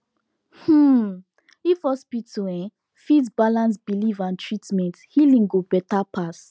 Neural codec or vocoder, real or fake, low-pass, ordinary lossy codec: none; real; none; none